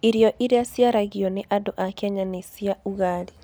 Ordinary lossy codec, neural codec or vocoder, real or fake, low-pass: none; none; real; none